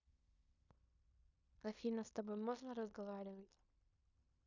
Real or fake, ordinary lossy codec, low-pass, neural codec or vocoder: fake; none; 7.2 kHz; codec, 16 kHz in and 24 kHz out, 0.9 kbps, LongCat-Audio-Codec, fine tuned four codebook decoder